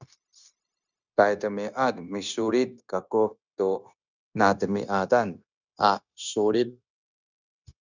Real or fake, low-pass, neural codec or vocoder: fake; 7.2 kHz; codec, 16 kHz, 0.9 kbps, LongCat-Audio-Codec